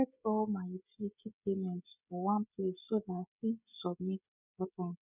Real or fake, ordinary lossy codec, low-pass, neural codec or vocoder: fake; none; 3.6 kHz; codec, 16 kHz, 16 kbps, FreqCodec, smaller model